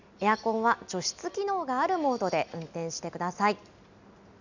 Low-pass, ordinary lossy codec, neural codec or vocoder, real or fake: 7.2 kHz; none; none; real